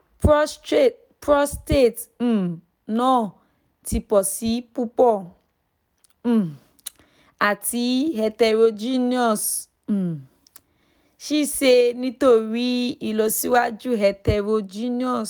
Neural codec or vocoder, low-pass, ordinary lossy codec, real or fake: none; none; none; real